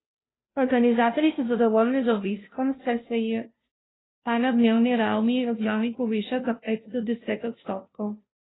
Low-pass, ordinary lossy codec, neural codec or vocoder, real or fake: 7.2 kHz; AAC, 16 kbps; codec, 16 kHz, 0.5 kbps, FunCodec, trained on Chinese and English, 25 frames a second; fake